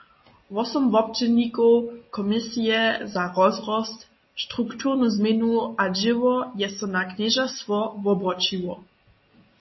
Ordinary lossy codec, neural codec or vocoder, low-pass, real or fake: MP3, 24 kbps; none; 7.2 kHz; real